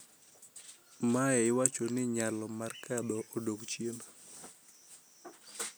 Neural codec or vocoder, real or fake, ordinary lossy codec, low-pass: none; real; none; none